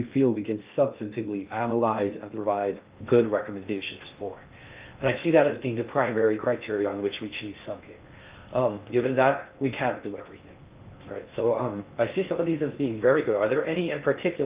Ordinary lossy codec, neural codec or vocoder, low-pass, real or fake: Opus, 32 kbps; codec, 16 kHz in and 24 kHz out, 0.6 kbps, FocalCodec, streaming, 2048 codes; 3.6 kHz; fake